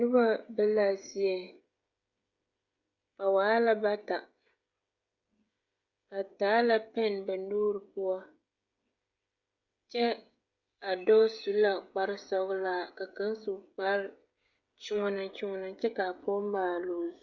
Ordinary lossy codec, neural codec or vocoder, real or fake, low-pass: Opus, 64 kbps; codec, 16 kHz, 16 kbps, FreqCodec, smaller model; fake; 7.2 kHz